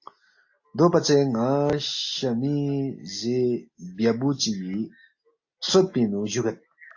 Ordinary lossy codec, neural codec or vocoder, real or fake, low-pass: AAC, 48 kbps; none; real; 7.2 kHz